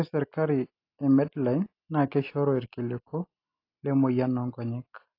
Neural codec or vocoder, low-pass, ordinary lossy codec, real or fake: none; 5.4 kHz; AAC, 32 kbps; real